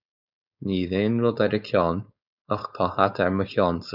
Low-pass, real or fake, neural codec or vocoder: 5.4 kHz; fake; codec, 16 kHz, 4.8 kbps, FACodec